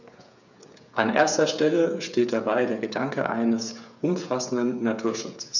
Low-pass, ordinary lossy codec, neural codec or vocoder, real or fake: 7.2 kHz; none; codec, 16 kHz, 8 kbps, FreqCodec, smaller model; fake